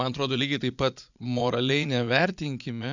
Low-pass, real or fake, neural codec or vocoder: 7.2 kHz; fake; vocoder, 22.05 kHz, 80 mel bands, Vocos